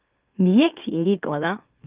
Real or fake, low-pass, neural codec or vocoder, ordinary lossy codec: fake; 3.6 kHz; autoencoder, 44.1 kHz, a latent of 192 numbers a frame, MeloTTS; Opus, 24 kbps